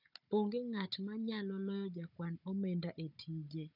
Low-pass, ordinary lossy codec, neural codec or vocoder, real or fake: 5.4 kHz; none; codec, 16 kHz, 16 kbps, FunCodec, trained on Chinese and English, 50 frames a second; fake